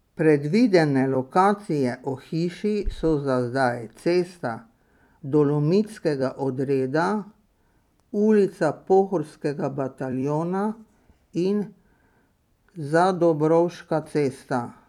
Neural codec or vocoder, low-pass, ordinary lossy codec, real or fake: vocoder, 44.1 kHz, 128 mel bands every 256 samples, BigVGAN v2; 19.8 kHz; none; fake